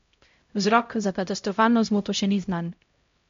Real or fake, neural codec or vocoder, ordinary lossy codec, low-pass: fake; codec, 16 kHz, 0.5 kbps, X-Codec, HuBERT features, trained on LibriSpeech; MP3, 48 kbps; 7.2 kHz